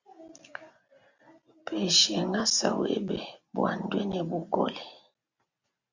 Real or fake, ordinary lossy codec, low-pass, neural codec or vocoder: real; Opus, 64 kbps; 7.2 kHz; none